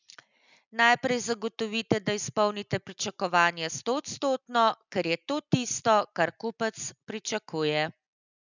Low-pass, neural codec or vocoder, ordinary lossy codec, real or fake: 7.2 kHz; none; none; real